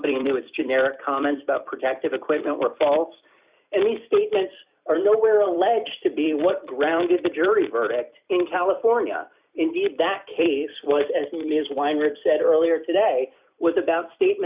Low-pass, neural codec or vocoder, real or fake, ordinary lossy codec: 3.6 kHz; vocoder, 44.1 kHz, 128 mel bands every 256 samples, BigVGAN v2; fake; Opus, 64 kbps